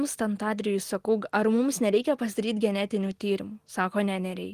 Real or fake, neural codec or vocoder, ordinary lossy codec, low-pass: real; none; Opus, 24 kbps; 14.4 kHz